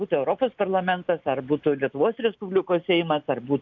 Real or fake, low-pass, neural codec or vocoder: real; 7.2 kHz; none